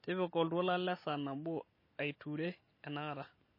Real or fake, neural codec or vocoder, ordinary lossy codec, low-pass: real; none; MP3, 24 kbps; 5.4 kHz